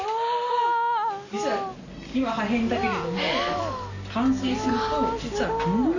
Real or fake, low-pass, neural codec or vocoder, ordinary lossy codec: real; 7.2 kHz; none; none